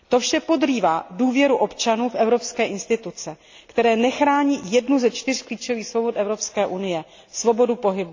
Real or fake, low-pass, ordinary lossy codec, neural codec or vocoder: real; 7.2 kHz; AAC, 48 kbps; none